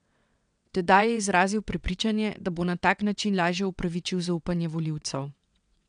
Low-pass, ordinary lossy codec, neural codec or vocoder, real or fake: 9.9 kHz; none; vocoder, 22.05 kHz, 80 mel bands, WaveNeXt; fake